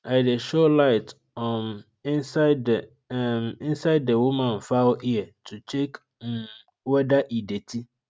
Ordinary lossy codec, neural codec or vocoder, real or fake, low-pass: none; none; real; none